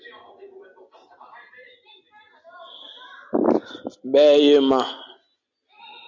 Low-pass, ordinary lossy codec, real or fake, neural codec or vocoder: 7.2 kHz; MP3, 48 kbps; real; none